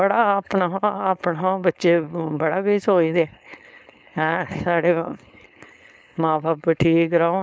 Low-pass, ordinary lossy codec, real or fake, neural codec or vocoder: none; none; fake; codec, 16 kHz, 4.8 kbps, FACodec